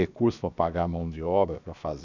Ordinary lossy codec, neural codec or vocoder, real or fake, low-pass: none; codec, 16 kHz, 0.7 kbps, FocalCodec; fake; 7.2 kHz